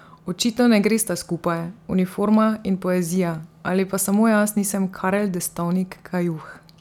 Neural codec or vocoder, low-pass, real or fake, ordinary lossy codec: none; 19.8 kHz; real; none